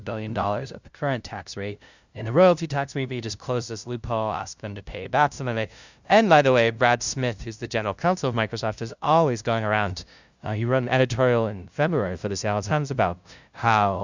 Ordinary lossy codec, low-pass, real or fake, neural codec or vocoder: Opus, 64 kbps; 7.2 kHz; fake; codec, 16 kHz, 0.5 kbps, FunCodec, trained on LibriTTS, 25 frames a second